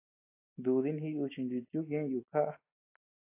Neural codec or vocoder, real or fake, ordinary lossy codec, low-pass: none; real; AAC, 32 kbps; 3.6 kHz